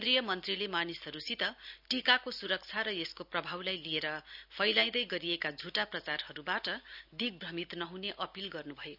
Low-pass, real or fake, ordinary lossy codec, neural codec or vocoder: 5.4 kHz; real; none; none